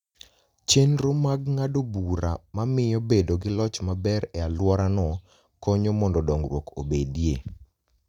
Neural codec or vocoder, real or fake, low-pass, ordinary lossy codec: none; real; 19.8 kHz; none